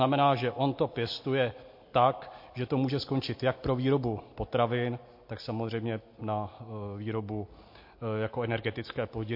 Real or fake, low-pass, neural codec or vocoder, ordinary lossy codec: real; 5.4 kHz; none; MP3, 32 kbps